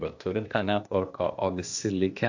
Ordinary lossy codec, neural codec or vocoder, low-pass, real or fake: AAC, 48 kbps; codec, 16 kHz, 0.8 kbps, ZipCodec; 7.2 kHz; fake